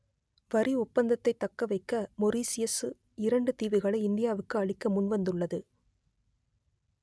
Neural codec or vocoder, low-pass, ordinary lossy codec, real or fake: none; none; none; real